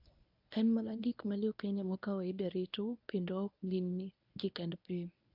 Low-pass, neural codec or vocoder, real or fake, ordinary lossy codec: 5.4 kHz; codec, 24 kHz, 0.9 kbps, WavTokenizer, medium speech release version 1; fake; AAC, 48 kbps